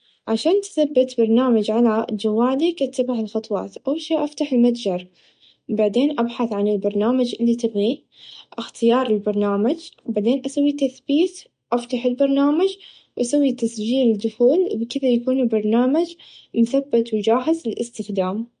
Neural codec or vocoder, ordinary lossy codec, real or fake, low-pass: none; MP3, 48 kbps; real; 9.9 kHz